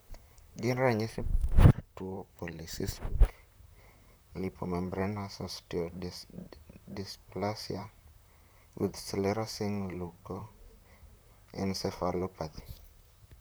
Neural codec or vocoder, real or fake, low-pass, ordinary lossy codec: vocoder, 44.1 kHz, 128 mel bands, Pupu-Vocoder; fake; none; none